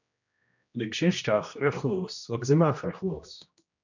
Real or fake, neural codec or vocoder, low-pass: fake; codec, 16 kHz, 1 kbps, X-Codec, HuBERT features, trained on general audio; 7.2 kHz